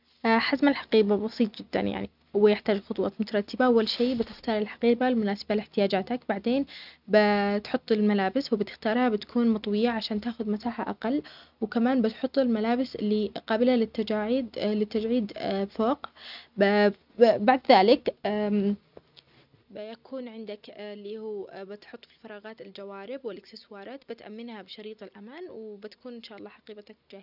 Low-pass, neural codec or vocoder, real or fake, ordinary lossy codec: 5.4 kHz; none; real; none